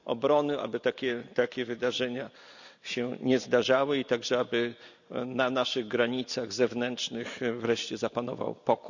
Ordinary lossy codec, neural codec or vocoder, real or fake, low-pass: none; none; real; 7.2 kHz